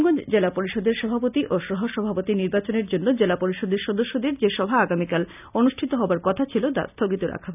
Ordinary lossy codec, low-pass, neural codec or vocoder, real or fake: none; 3.6 kHz; none; real